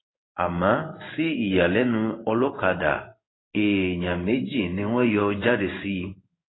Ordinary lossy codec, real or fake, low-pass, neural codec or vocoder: AAC, 16 kbps; fake; 7.2 kHz; codec, 16 kHz in and 24 kHz out, 1 kbps, XY-Tokenizer